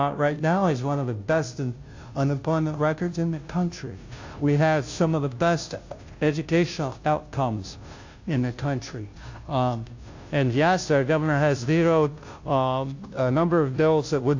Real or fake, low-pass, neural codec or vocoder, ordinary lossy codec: fake; 7.2 kHz; codec, 16 kHz, 0.5 kbps, FunCodec, trained on Chinese and English, 25 frames a second; AAC, 48 kbps